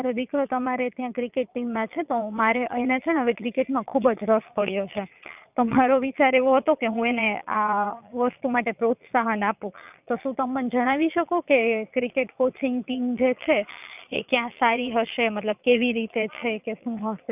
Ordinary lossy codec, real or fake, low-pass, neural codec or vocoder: none; fake; 3.6 kHz; vocoder, 22.05 kHz, 80 mel bands, Vocos